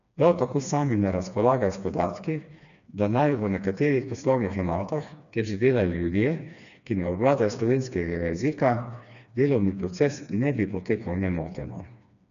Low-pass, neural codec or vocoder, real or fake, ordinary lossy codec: 7.2 kHz; codec, 16 kHz, 2 kbps, FreqCodec, smaller model; fake; none